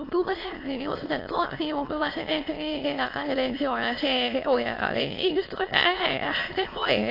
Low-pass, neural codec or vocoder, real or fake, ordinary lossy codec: 5.4 kHz; autoencoder, 22.05 kHz, a latent of 192 numbers a frame, VITS, trained on many speakers; fake; AAC, 32 kbps